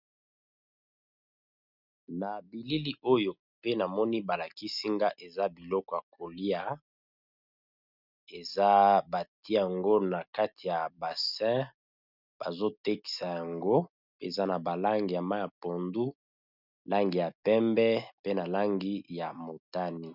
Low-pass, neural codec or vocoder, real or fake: 5.4 kHz; none; real